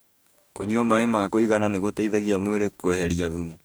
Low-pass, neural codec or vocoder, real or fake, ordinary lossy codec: none; codec, 44.1 kHz, 2.6 kbps, DAC; fake; none